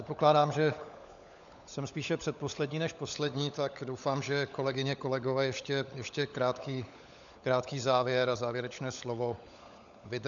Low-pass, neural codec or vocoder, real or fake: 7.2 kHz; codec, 16 kHz, 16 kbps, FunCodec, trained on LibriTTS, 50 frames a second; fake